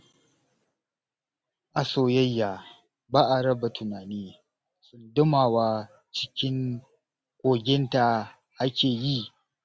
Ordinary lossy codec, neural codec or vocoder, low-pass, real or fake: none; none; none; real